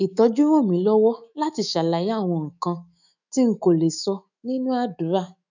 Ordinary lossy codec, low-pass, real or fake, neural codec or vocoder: none; 7.2 kHz; fake; autoencoder, 48 kHz, 128 numbers a frame, DAC-VAE, trained on Japanese speech